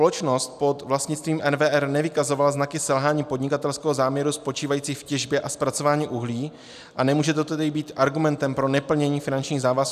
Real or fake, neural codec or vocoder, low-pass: real; none; 14.4 kHz